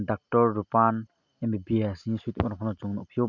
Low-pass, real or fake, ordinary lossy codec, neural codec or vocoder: 7.2 kHz; real; none; none